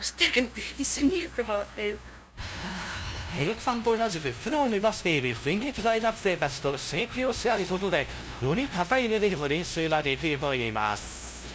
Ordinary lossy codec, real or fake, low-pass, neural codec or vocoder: none; fake; none; codec, 16 kHz, 0.5 kbps, FunCodec, trained on LibriTTS, 25 frames a second